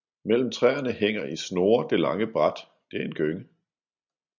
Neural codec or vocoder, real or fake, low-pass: none; real; 7.2 kHz